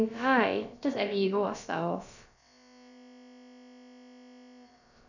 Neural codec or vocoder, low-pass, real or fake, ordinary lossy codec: codec, 16 kHz, about 1 kbps, DyCAST, with the encoder's durations; 7.2 kHz; fake; none